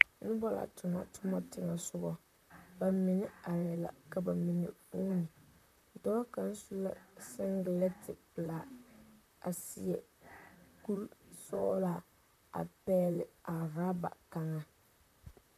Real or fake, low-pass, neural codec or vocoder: fake; 14.4 kHz; vocoder, 44.1 kHz, 128 mel bands, Pupu-Vocoder